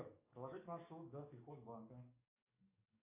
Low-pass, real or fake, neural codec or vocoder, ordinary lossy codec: 3.6 kHz; fake; codec, 44.1 kHz, 7.8 kbps, DAC; AAC, 32 kbps